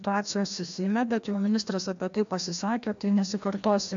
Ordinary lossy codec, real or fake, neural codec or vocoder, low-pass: AAC, 64 kbps; fake; codec, 16 kHz, 1 kbps, FreqCodec, larger model; 7.2 kHz